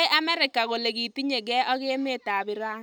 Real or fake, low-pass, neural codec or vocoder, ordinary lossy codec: real; none; none; none